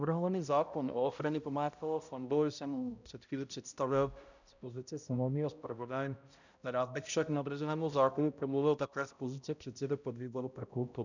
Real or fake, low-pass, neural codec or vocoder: fake; 7.2 kHz; codec, 16 kHz, 0.5 kbps, X-Codec, HuBERT features, trained on balanced general audio